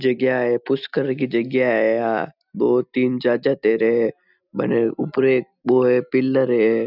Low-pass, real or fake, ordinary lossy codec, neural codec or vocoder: 5.4 kHz; real; none; none